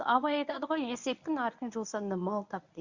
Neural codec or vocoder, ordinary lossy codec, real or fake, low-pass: codec, 24 kHz, 0.9 kbps, WavTokenizer, medium speech release version 1; Opus, 64 kbps; fake; 7.2 kHz